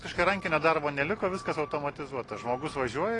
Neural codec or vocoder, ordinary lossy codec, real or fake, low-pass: none; AAC, 32 kbps; real; 10.8 kHz